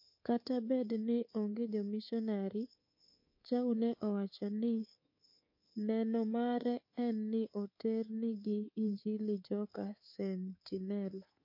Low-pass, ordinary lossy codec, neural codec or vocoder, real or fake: 5.4 kHz; MP3, 32 kbps; codec, 24 kHz, 3.1 kbps, DualCodec; fake